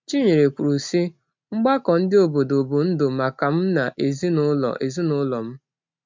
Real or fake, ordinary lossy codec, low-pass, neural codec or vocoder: real; MP3, 64 kbps; 7.2 kHz; none